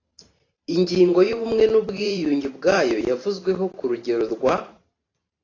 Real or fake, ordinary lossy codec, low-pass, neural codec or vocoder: fake; AAC, 32 kbps; 7.2 kHz; vocoder, 44.1 kHz, 128 mel bands every 512 samples, BigVGAN v2